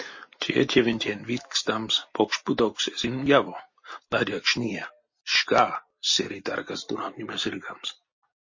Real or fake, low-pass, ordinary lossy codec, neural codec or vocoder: real; 7.2 kHz; MP3, 32 kbps; none